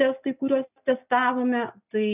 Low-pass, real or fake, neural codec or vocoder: 3.6 kHz; real; none